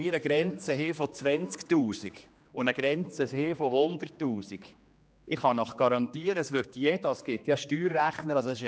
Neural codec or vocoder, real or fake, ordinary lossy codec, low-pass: codec, 16 kHz, 2 kbps, X-Codec, HuBERT features, trained on general audio; fake; none; none